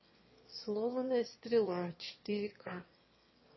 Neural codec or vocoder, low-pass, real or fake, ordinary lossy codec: autoencoder, 22.05 kHz, a latent of 192 numbers a frame, VITS, trained on one speaker; 7.2 kHz; fake; MP3, 24 kbps